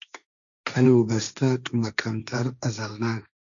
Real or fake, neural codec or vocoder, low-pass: fake; codec, 16 kHz, 1.1 kbps, Voila-Tokenizer; 7.2 kHz